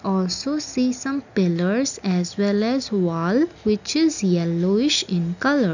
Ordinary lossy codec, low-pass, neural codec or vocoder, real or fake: none; 7.2 kHz; none; real